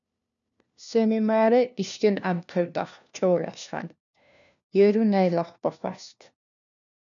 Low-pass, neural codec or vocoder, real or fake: 7.2 kHz; codec, 16 kHz, 1 kbps, FunCodec, trained on LibriTTS, 50 frames a second; fake